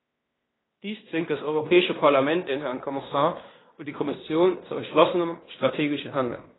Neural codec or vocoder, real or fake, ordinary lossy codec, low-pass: codec, 16 kHz in and 24 kHz out, 0.9 kbps, LongCat-Audio-Codec, fine tuned four codebook decoder; fake; AAC, 16 kbps; 7.2 kHz